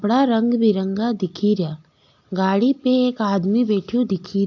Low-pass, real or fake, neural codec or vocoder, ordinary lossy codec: 7.2 kHz; real; none; AAC, 48 kbps